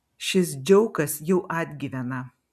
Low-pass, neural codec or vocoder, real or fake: 14.4 kHz; none; real